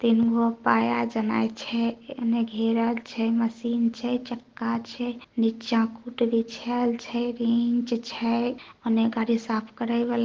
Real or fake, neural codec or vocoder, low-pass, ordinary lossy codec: real; none; 7.2 kHz; Opus, 16 kbps